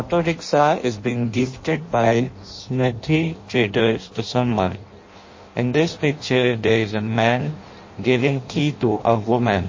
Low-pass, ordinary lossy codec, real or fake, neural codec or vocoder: 7.2 kHz; MP3, 32 kbps; fake; codec, 16 kHz in and 24 kHz out, 0.6 kbps, FireRedTTS-2 codec